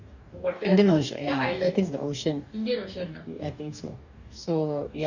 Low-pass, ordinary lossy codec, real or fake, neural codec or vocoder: 7.2 kHz; none; fake; codec, 44.1 kHz, 2.6 kbps, DAC